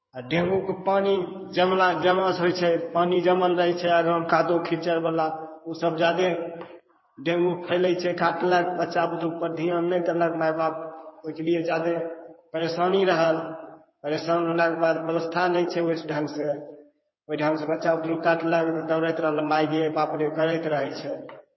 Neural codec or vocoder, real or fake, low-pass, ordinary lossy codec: codec, 16 kHz in and 24 kHz out, 2.2 kbps, FireRedTTS-2 codec; fake; 7.2 kHz; MP3, 24 kbps